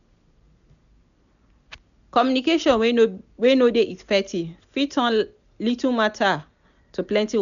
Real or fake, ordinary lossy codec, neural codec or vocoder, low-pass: real; none; none; 7.2 kHz